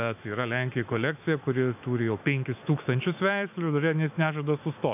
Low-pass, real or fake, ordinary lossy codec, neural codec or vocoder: 3.6 kHz; fake; AAC, 32 kbps; autoencoder, 48 kHz, 128 numbers a frame, DAC-VAE, trained on Japanese speech